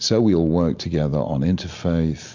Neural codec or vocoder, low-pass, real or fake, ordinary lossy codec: none; 7.2 kHz; real; MP3, 64 kbps